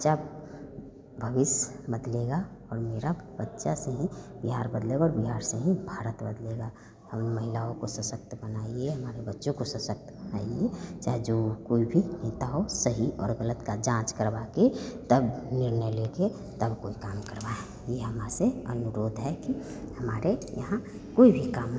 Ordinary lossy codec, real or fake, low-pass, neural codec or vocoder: none; real; none; none